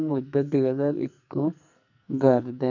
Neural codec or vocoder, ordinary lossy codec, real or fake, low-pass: codec, 44.1 kHz, 2.6 kbps, SNAC; none; fake; 7.2 kHz